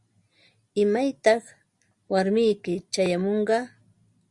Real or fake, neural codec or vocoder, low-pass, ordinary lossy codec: real; none; 10.8 kHz; Opus, 64 kbps